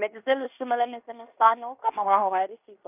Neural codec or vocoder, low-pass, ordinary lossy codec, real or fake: codec, 16 kHz in and 24 kHz out, 0.9 kbps, LongCat-Audio-Codec, fine tuned four codebook decoder; 3.6 kHz; none; fake